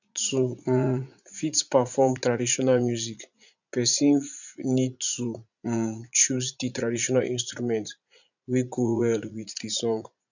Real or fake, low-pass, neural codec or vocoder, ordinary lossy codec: fake; 7.2 kHz; vocoder, 44.1 kHz, 80 mel bands, Vocos; none